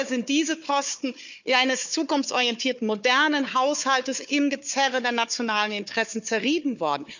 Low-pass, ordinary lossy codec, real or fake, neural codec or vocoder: 7.2 kHz; none; fake; codec, 16 kHz, 4 kbps, FunCodec, trained on Chinese and English, 50 frames a second